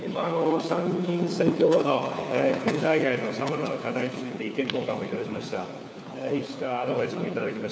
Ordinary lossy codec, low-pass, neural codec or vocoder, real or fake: none; none; codec, 16 kHz, 4 kbps, FunCodec, trained on LibriTTS, 50 frames a second; fake